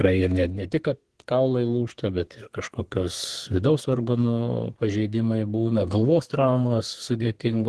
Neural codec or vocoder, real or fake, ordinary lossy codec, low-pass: codec, 32 kHz, 1.9 kbps, SNAC; fake; Opus, 16 kbps; 10.8 kHz